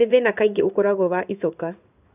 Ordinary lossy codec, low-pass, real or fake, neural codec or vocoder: none; 3.6 kHz; fake; codec, 16 kHz in and 24 kHz out, 1 kbps, XY-Tokenizer